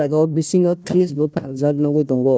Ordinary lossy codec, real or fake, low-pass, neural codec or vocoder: none; fake; none; codec, 16 kHz, 1 kbps, FunCodec, trained on Chinese and English, 50 frames a second